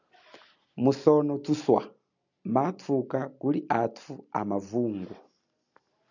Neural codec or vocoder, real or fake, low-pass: none; real; 7.2 kHz